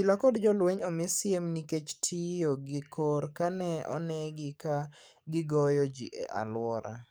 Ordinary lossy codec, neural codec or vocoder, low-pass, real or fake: none; codec, 44.1 kHz, 7.8 kbps, DAC; none; fake